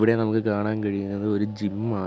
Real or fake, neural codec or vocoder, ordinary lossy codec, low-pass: real; none; none; none